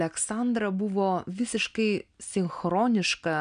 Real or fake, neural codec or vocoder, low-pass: real; none; 9.9 kHz